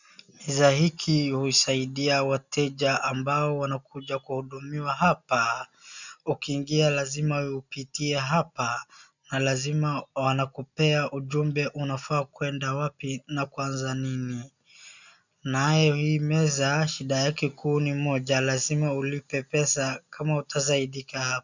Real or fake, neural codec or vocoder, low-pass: real; none; 7.2 kHz